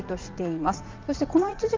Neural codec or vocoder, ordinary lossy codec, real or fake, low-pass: vocoder, 44.1 kHz, 80 mel bands, Vocos; Opus, 24 kbps; fake; 7.2 kHz